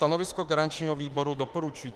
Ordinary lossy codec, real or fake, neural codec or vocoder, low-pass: Opus, 24 kbps; fake; autoencoder, 48 kHz, 32 numbers a frame, DAC-VAE, trained on Japanese speech; 14.4 kHz